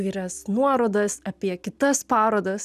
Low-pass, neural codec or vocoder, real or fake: 14.4 kHz; none; real